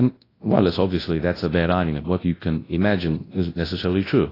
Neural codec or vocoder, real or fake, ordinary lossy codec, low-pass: codec, 24 kHz, 0.9 kbps, WavTokenizer, large speech release; fake; AAC, 24 kbps; 5.4 kHz